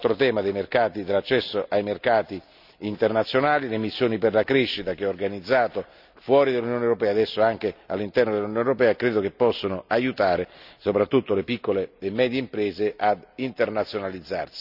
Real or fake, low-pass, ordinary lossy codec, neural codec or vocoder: real; 5.4 kHz; none; none